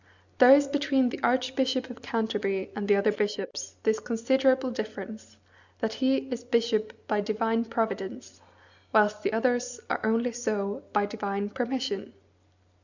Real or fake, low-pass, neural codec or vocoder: real; 7.2 kHz; none